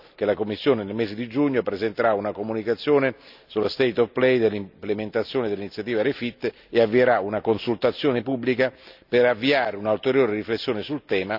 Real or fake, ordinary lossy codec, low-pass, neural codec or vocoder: real; none; 5.4 kHz; none